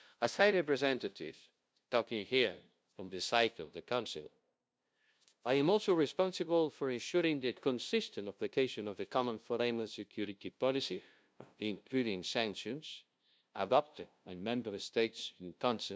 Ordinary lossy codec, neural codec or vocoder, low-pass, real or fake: none; codec, 16 kHz, 0.5 kbps, FunCodec, trained on LibriTTS, 25 frames a second; none; fake